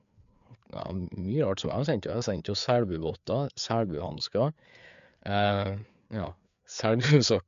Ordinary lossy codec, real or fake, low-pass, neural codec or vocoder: MP3, 64 kbps; fake; 7.2 kHz; codec, 16 kHz, 4 kbps, FreqCodec, larger model